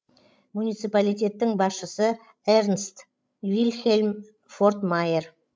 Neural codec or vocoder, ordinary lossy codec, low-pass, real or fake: codec, 16 kHz, 16 kbps, FreqCodec, larger model; none; none; fake